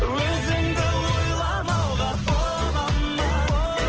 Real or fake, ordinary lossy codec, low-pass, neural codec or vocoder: real; Opus, 16 kbps; 7.2 kHz; none